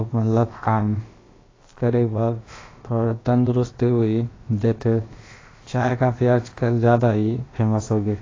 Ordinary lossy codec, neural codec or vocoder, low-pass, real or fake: AAC, 32 kbps; codec, 16 kHz, about 1 kbps, DyCAST, with the encoder's durations; 7.2 kHz; fake